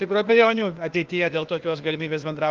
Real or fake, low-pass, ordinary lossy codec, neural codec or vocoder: fake; 7.2 kHz; Opus, 32 kbps; codec, 16 kHz, 0.8 kbps, ZipCodec